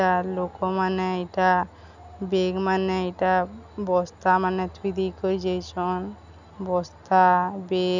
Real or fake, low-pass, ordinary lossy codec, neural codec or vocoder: real; 7.2 kHz; none; none